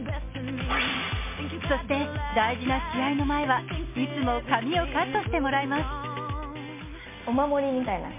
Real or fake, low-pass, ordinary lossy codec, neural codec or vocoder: real; 3.6 kHz; MP3, 24 kbps; none